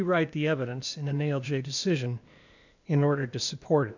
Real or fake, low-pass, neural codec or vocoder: fake; 7.2 kHz; codec, 16 kHz, 0.8 kbps, ZipCodec